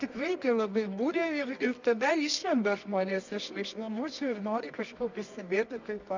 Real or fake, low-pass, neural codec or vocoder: fake; 7.2 kHz; codec, 24 kHz, 0.9 kbps, WavTokenizer, medium music audio release